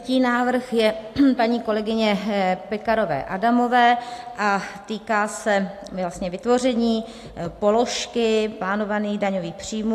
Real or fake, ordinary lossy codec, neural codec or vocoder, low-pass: real; AAC, 64 kbps; none; 14.4 kHz